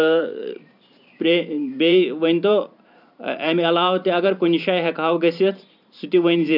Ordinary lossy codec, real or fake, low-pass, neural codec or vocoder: none; fake; 5.4 kHz; vocoder, 44.1 kHz, 128 mel bands every 512 samples, BigVGAN v2